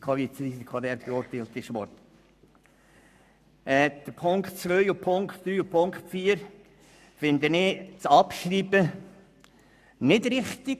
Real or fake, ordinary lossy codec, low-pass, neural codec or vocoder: fake; none; 14.4 kHz; codec, 44.1 kHz, 7.8 kbps, Pupu-Codec